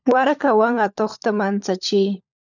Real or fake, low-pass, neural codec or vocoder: fake; 7.2 kHz; codec, 16 kHz, 16 kbps, FunCodec, trained on LibriTTS, 50 frames a second